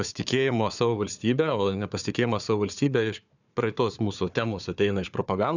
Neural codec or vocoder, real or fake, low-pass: codec, 16 kHz, 4 kbps, FunCodec, trained on Chinese and English, 50 frames a second; fake; 7.2 kHz